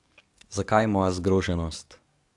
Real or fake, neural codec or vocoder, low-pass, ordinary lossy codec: fake; codec, 44.1 kHz, 7.8 kbps, DAC; 10.8 kHz; AAC, 64 kbps